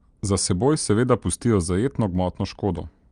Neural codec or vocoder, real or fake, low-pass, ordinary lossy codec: none; real; 9.9 kHz; Opus, 32 kbps